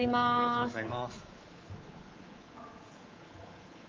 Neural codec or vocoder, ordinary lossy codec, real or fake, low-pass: codec, 24 kHz, 3.1 kbps, DualCodec; Opus, 32 kbps; fake; 7.2 kHz